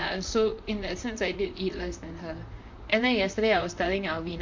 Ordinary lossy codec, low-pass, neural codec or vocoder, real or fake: MP3, 48 kbps; 7.2 kHz; vocoder, 44.1 kHz, 128 mel bands, Pupu-Vocoder; fake